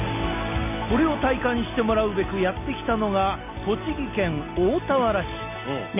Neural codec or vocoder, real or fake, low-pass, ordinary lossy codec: none; real; 3.6 kHz; none